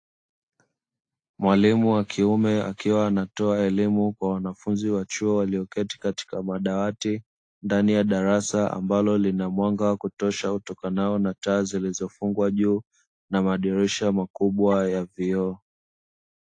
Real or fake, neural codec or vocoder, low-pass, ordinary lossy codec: real; none; 9.9 kHz; AAC, 48 kbps